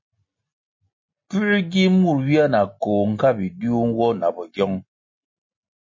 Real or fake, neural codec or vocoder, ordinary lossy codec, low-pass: real; none; MP3, 32 kbps; 7.2 kHz